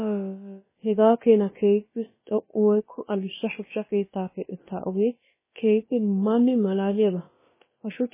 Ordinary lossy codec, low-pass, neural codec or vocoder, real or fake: MP3, 16 kbps; 3.6 kHz; codec, 16 kHz, about 1 kbps, DyCAST, with the encoder's durations; fake